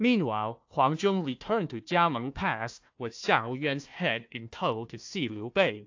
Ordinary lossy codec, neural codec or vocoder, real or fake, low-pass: AAC, 48 kbps; codec, 16 kHz, 1 kbps, FunCodec, trained on Chinese and English, 50 frames a second; fake; 7.2 kHz